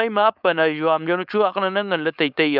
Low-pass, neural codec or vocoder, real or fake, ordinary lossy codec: 5.4 kHz; codec, 16 kHz, 4.8 kbps, FACodec; fake; none